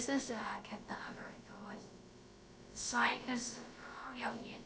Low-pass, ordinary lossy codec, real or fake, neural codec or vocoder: none; none; fake; codec, 16 kHz, about 1 kbps, DyCAST, with the encoder's durations